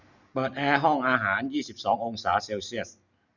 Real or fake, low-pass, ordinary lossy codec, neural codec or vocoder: real; 7.2 kHz; none; none